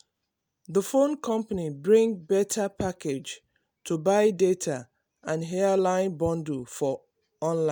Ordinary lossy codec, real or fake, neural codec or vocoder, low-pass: none; real; none; none